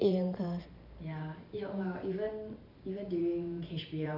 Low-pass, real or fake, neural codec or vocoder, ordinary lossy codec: 5.4 kHz; fake; vocoder, 44.1 kHz, 128 mel bands every 512 samples, BigVGAN v2; none